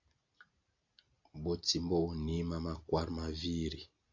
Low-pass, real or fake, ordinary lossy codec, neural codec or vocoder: 7.2 kHz; real; AAC, 48 kbps; none